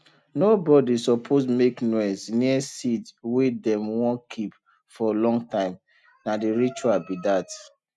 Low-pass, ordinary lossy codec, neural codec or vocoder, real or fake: none; none; none; real